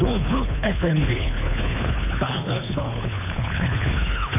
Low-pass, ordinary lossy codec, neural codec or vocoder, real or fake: 3.6 kHz; none; codec, 24 kHz, 3 kbps, HILCodec; fake